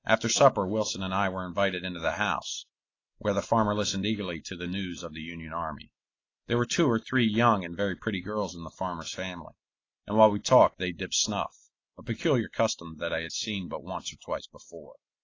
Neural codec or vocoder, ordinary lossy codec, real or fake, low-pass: none; AAC, 32 kbps; real; 7.2 kHz